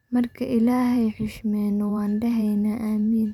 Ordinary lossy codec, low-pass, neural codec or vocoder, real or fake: none; 19.8 kHz; vocoder, 44.1 kHz, 128 mel bands every 512 samples, BigVGAN v2; fake